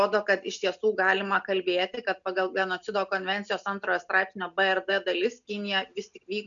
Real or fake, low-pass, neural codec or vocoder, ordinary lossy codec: real; 7.2 kHz; none; MP3, 96 kbps